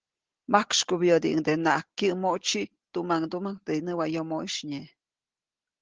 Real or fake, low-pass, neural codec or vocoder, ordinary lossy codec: real; 7.2 kHz; none; Opus, 16 kbps